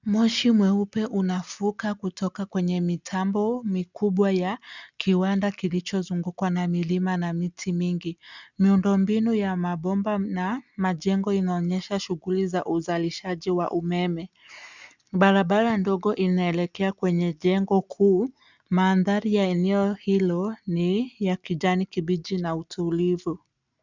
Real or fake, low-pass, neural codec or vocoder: real; 7.2 kHz; none